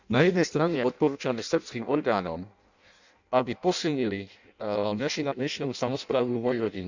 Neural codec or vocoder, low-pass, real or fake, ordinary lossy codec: codec, 16 kHz in and 24 kHz out, 0.6 kbps, FireRedTTS-2 codec; 7.2 kHz; fake; none